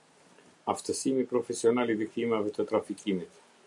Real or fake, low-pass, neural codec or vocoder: real; 10.8 kHz; none